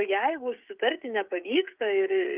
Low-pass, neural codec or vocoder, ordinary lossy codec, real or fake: 3.6 kHz; codec, 16 kHz, 16 kbps, FreqCodec, smaller model; Opus, 32 kbps; fake